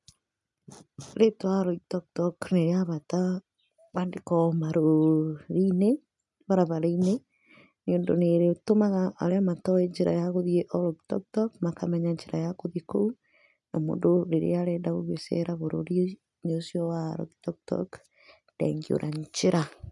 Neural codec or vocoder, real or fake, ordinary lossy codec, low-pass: none; real; none; 10.8 kHz